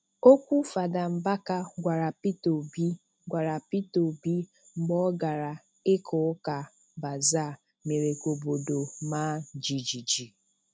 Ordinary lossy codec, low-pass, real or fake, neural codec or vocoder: none; none; real; none